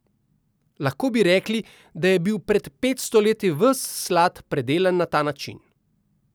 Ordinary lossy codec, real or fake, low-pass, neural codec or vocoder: none; real; none; none